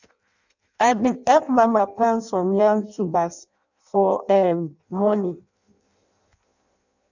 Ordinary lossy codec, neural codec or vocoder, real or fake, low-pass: none; codec, 16 kHz in and 24 kHz out, 0.6 kbps, FireRedTTS-2 codec; fake; 7.2 kHz